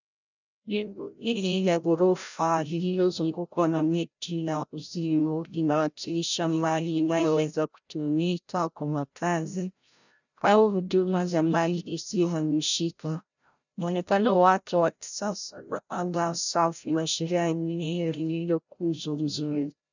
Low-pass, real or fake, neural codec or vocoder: 7.2 kHz; fake; codec, 16 kHz, 0.5 kbps, FreqCodec, larger model